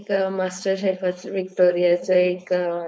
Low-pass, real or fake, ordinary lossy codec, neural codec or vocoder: none; fake; none; codec, 16 kHz, 4.8 kbps, FACodec